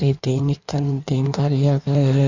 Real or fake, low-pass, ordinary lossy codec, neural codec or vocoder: fake; 7.2 kHz; none; codec, 16 kHz in and 24 kHz out, 1.1 kbps, FireRedTTS-2 codec